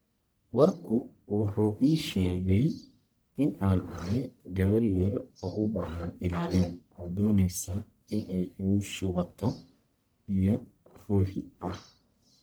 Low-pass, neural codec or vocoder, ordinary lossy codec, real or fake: none; codec, 44.1 kHz, 1.7 kbps, Pupu-Codec; none; fake